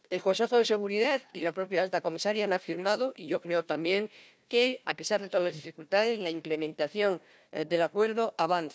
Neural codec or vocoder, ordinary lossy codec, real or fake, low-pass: codec, 16 kHz, 1 kbps, FunCodec, trained on Chinese and English, 50 frames a second; none; fake; none